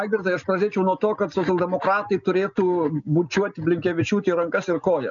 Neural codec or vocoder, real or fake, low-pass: none; real; 7.2 kHz